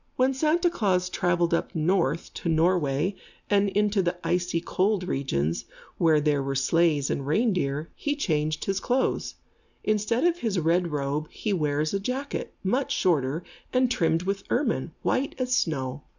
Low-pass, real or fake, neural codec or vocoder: 7.2 kHz; real; none